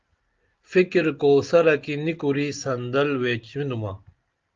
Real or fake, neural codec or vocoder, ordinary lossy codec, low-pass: real; none; Opus, 32 kbps; 7.2 kHz